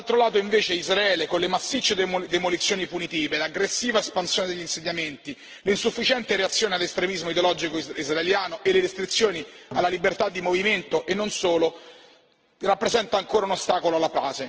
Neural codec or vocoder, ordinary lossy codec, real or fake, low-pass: none; Opus, 16 kbps; real; 7.2 kHz